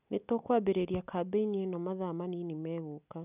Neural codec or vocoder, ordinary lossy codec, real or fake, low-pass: none; none; real; 3.6 kHz